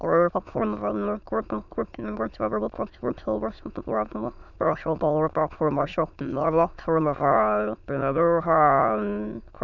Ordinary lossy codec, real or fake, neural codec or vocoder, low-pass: none; fake; autoencoder, 22.05 kHz, a latent of 192 numbers a frame, VITS, trained on many speakers; 7.2 kHz